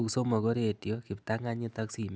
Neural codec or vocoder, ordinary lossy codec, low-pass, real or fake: none; none; none; real